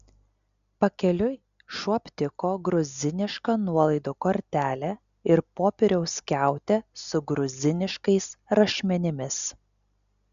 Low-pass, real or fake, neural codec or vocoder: 7.2 kHz; real; none